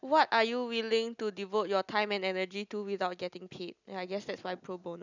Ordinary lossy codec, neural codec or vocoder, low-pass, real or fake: none; none; 7.2 kHz; real